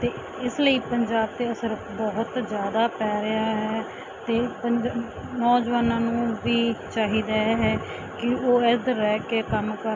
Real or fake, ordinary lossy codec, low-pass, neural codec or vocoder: real; MP3, 48 kbps; 7.2 kHz; none